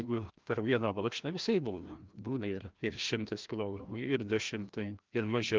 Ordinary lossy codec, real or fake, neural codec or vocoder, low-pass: Opus, 32 kbps; fake; codec, 16 kHz, 1 kbps, FreqCodec, larger model; 7.2 kHz